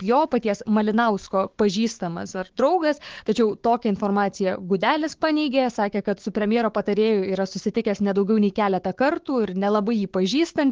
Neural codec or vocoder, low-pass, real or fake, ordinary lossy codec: codec, 16 kHz, 6 kbps, DAC; 7.2 kHz; fake; Opus, 16 kbps